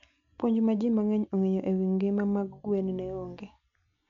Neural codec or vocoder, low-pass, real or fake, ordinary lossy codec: none; 7.2 kHz; real; none